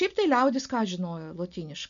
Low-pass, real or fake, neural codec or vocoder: 7.2 kHz; real; none